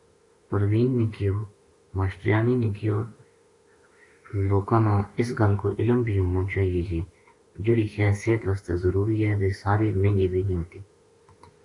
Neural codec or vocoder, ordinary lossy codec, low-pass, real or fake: autoencoder, 48 kHz, 32 numbers a frame, DAC-VAE, trained on Japanese speech; AAC, 48 kbps; 10.8 kHz; fake